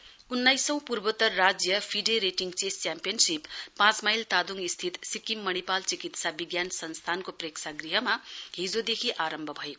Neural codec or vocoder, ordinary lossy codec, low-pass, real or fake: none; none; none; real